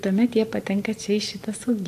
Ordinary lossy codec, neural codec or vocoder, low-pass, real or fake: AAC, 64 kbps; vocoder, 44.1 kHz, 128 mel bands every 512 samples, BigVGAN v2; 14.4 kHz; fake